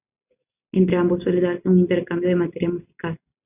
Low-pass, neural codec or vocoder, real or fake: 3.6 kHz; none; real